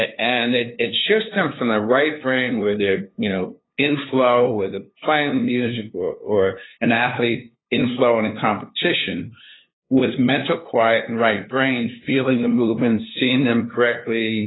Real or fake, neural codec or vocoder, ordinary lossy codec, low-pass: fake; codec, 16 kHz, 2 kbps, FunCodec, trained on LibriTTS, 25 frames a second; AAC, 16 kbps; 7.2 kHz